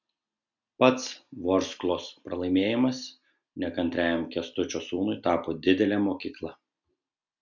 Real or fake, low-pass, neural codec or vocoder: real; 7.2 kHz; none